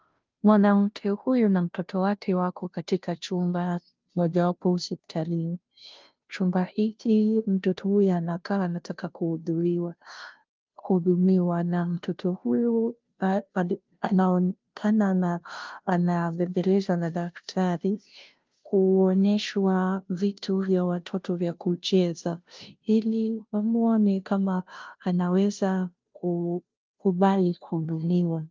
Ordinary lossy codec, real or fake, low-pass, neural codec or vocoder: Opus, 32 kbps; fake; 7.2 kHz; codec, 16 kHz, 0.5 kbps, FunCodec, trained on Chinese and English, 25 frames a second